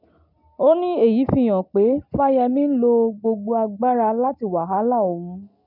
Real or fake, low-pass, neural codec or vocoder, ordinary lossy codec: real; 5.4 kHz; none; none